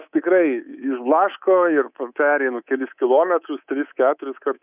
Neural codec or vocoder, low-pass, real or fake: codec, 24 kHz, 3.1 kbps, DualCodec; 3.6 kHz; fake